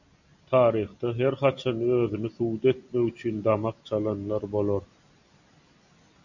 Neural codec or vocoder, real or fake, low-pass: none; real; 7.2 kHz